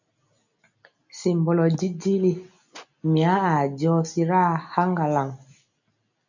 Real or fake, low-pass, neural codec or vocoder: real; 7.2 kHz; none